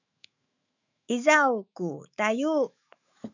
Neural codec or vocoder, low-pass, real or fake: autoencoder, 48 kHz, 128 numbers a frame, DAC-VAE, trained on Japanese speech; 7.2 kHz; fake